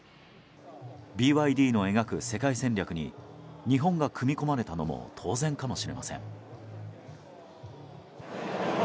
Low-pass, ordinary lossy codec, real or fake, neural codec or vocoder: none; none; real; none